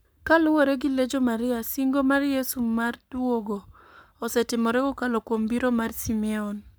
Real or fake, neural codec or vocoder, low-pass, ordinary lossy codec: fake; codec, 44.1 kHz, 7.8 kbps, Pupu-Codec; none; none